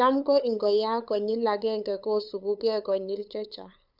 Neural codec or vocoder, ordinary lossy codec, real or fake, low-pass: codec, 16 kHz, 8 kbps, FunCodec, trained on LibriTTS, 25 frames a second; none; fake; 5.4 kHz